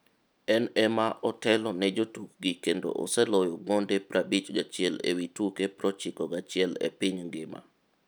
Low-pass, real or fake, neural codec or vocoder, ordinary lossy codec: none; real; none; none